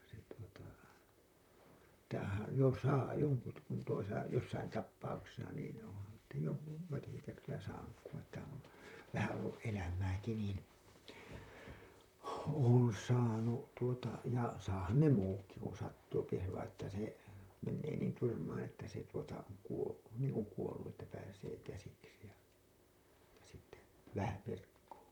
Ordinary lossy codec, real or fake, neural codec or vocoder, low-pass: none; fake; vocoder, 44.1 kHz, 128 mel bands, Pupu-Vocoder; 19.8 kHz